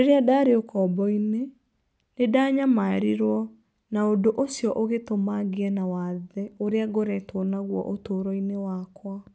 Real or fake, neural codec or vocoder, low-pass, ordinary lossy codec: real; none; none; none